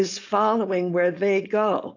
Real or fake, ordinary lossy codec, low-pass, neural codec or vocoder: fake; AAC, 48 kbps; 7.2 kHz; codec, 16 kHz, 4.8 kbps, FACodec